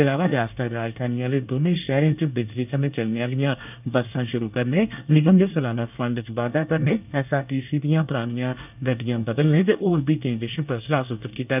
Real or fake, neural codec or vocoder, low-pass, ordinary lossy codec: fake; codec, 24 kHz, 1 kbps, SNAC; 3.6 kHz; none